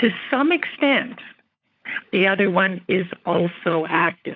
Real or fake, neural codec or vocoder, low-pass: fake; codec, 16 kHz, 16 kbps, FunCodec, trained on LibriTTS, 50 frames a second; 7.2 kHz